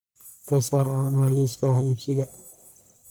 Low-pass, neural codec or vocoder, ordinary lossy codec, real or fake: none; codec, 44.1 kHz, 1.7 kbps, Pupu-Codec; none; fake